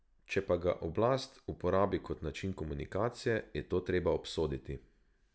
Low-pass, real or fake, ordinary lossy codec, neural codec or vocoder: none; real; none; none